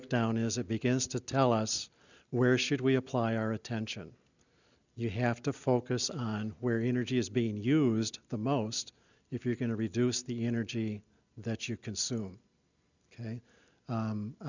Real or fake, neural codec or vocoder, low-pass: real; none; 7.2 kHz